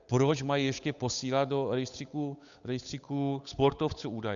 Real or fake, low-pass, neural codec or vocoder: fake; 7.2 kHz; codec, 16 kHz, 8 kbps, FunCodec, trained on Chinese and English, 25 frames a second